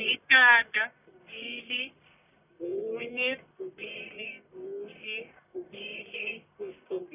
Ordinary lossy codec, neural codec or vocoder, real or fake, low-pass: none; codec, 44.1 kHz, 1.7 kbps, Pupu-Codec; fake; 3.6 kHz